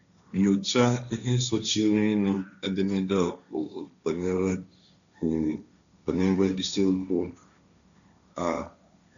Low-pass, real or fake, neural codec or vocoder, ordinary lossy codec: 7.2 kHz; fake; codec, 16 kHz, 1.1 kbps, Voila-Tokenizer; none